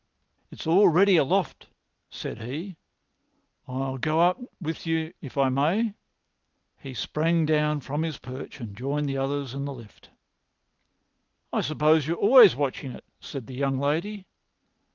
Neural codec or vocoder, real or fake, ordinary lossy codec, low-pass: none; real; Opus, 32 kbps; 7.2 kHz